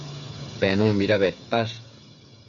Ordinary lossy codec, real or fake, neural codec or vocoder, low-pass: AAC, 64 kbps; fake; codec, 16 kHz, 8 kbps, FreqCodec, smaller model; 7.2 kHz